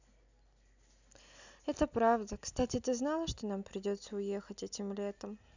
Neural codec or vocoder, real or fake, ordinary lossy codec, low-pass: none; real; none; 7.2 kHz